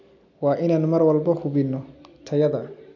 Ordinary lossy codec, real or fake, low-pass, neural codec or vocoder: none; real; 7.2 kHz; none